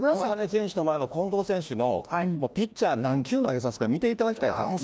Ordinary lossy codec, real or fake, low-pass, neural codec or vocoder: none; fake; none; codec, 16 kHz, 1 kbps, FreqCodec, larger model